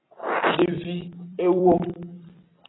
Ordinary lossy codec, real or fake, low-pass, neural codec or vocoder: AAC, 16 kbps; fake; 7.2 kHz; vocoder, 44.1 kHz, 128 mel bands every 256 samples, BigVGAN v2